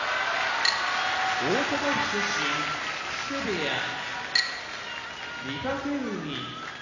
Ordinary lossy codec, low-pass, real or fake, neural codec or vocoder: none; 7.2 kHz; real; none